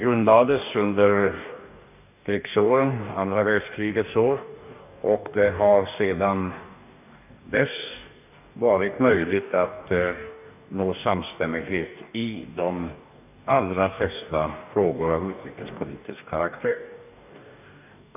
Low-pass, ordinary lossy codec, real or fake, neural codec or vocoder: 3.6 kHz; none; fake; codec, 44.1 kHz, 2.6 kbps, DAC